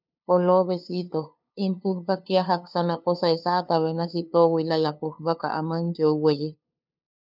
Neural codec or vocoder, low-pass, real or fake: codec, 16 kHz, 2 kbps, FunCodec, trained on LibriTTS, 25 frames a second; 5.4 kHz; fake